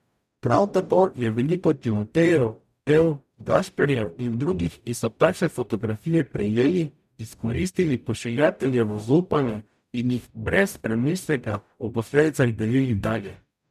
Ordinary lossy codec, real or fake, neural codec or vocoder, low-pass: none; fake; codec, 44.1 kHz, 0.9 kbps, DAC; 14.4 kHz